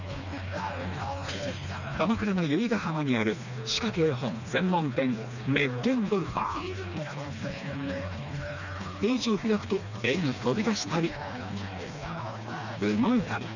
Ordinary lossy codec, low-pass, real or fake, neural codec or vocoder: none; 7.2 kHz; fake; codec, 16 kHz, 2 kbps, FreqCodec, smaller model